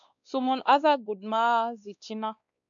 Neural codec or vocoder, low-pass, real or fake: codec, 16 kHz, 2 kbps, X-Codec, WavLM features, trained on Multilingual LibriSpeech; 7.2 kHz; fake